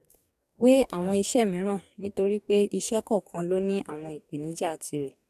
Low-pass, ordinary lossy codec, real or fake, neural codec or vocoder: 14.4 kHz; none; fake; codec, 44.1 kHz, 2.6 kbps, DAC